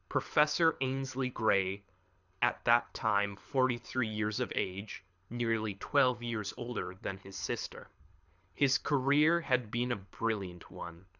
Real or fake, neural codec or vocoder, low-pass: fake; codec, 24 kHz, 6 kbps, HILCodec; 7.2 kHz